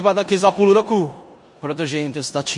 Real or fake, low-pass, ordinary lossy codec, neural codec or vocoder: fake; 10.8 kHz; MP3, 48 kbps; codec, 16 kHz in and 24 kHz out, 0.9 kbps, LongCat-Audio-Codec, four codebook decoder